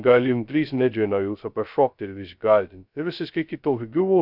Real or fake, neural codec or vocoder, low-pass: fake; codec, 16 kHz, 0.2 kbps, FocalCodec; 5.4 kHz